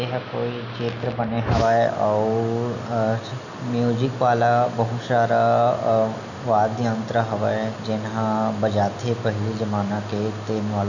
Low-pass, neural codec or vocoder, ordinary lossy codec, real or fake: 7.2 kHz; none; none; real